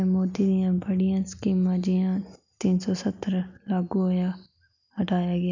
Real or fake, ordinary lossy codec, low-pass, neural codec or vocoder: real; none; 7.2 kHz; none